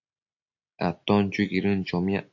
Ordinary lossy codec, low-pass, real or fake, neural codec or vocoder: AAC, 48 kbps; 7.2 kHz; real; none